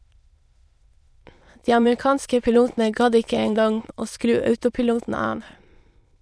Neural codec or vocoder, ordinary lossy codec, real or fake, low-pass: autoencoder, 22.05 kHz, a latent of 192 numbers a frame, VITS, trained on many speakers; none; fake; none